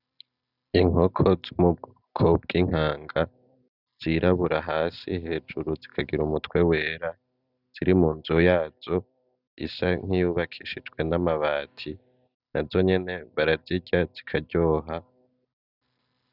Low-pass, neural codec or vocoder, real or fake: 5.4 kHz; none; real